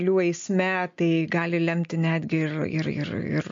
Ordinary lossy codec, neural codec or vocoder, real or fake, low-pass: MP3, 48 kbps; none; real; 7.2 kHz